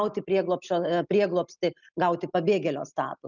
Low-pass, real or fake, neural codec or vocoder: 7.2 kHz; real; none